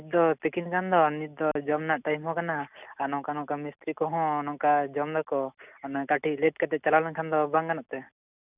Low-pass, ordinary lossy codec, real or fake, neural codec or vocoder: 3.6 kHz; none; real; none